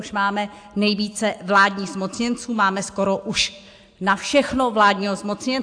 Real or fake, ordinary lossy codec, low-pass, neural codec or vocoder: real; AAC, 64 kbps; 9.9 kHz; none